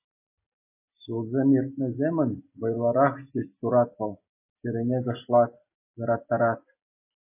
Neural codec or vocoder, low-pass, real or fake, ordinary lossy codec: none; 3.6 kHz; real; MP3, 24 kbps